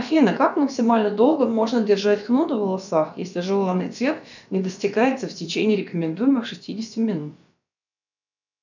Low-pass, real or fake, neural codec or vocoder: 7.2 kHz; fake; codec, 16 kHz, about 1 kbps, DyCAST, with the encoder's durations